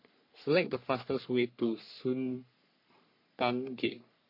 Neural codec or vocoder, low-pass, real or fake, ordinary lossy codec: codec, 44.1 kHz, 3.4 kbps, Pupu-Codec; 5.4 kHz; fake; MP3, 32 kbps